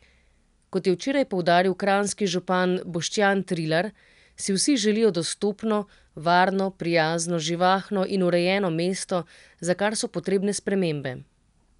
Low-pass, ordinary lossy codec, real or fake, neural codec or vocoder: 10.8 kHz; none; real; none